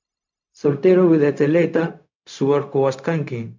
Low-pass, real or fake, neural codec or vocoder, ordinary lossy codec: 7.2 kHz; fake; codec, 16 kHz, 0.4 kbps, LongCat-Audio-Codec; MP3, 64 kbps